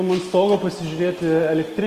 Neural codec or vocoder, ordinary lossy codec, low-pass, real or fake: none; Opus, 32 kbps; 14.4 kHz; real